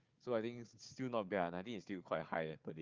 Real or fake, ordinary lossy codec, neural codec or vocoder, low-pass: fake; Opus, 24 kbps; codec, 16 kHz, 4 kbps, FunCodec, trained on Chinese and English, 50 frames a second; 7.2 kHz